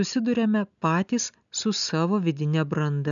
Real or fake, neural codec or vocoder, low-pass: real; none; 7.2 kHz